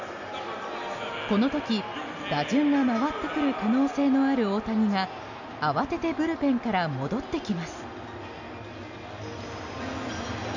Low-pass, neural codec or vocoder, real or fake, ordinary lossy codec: 7.2 kHz; none; real; none